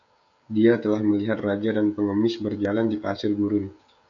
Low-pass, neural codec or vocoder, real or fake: 7.2 kHz; codec, 16 kHz, 16 kbps, FreqCodec, smaller model; fake